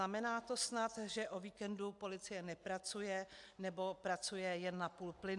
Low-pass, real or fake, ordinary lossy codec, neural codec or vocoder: 10.8 kHz; real; AAC, 64 kbps; none